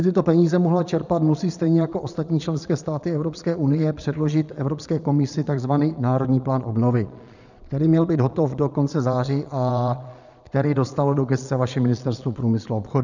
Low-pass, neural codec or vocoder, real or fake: 7.2 kHz; vocoder, 22.05 kHz, 80 mel bands, Vocos; fake